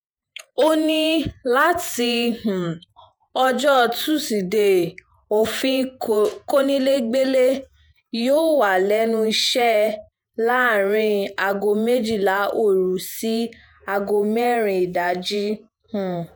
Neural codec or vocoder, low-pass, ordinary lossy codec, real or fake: vocoder, 48 kHz, 128 mel bands, Vocos; none; none; fake